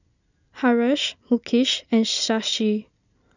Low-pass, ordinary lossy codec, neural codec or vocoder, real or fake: 7.2 kHz; none; none; real